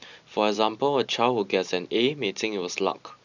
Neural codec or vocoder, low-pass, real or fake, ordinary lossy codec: vocoder, 44.1 kHz, 128 mel bands every 512 samples, BigVGAN v2; 7.2 kHz; fake; none